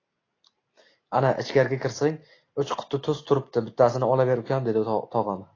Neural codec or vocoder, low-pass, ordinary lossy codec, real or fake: none; 7.2 kHz; AAC, 32 kbps; real